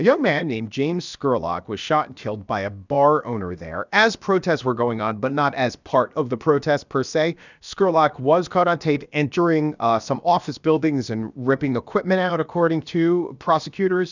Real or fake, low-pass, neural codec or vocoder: fake; 7.2 kHz; codec, 16 kHz, about 1 kbps, DyCAST, with the encoder's durations